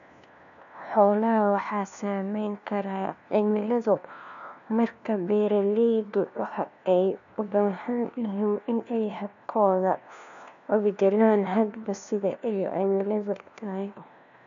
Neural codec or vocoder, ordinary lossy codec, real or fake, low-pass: codec, 16 kHz, 1 kbps, FunCodec, trained on LibriTTS, 50 frames a second; none; fake; 7.2 kHz